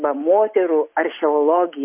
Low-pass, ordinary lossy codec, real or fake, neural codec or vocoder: 3.6 kHz; MP3, 24 kbps; real; none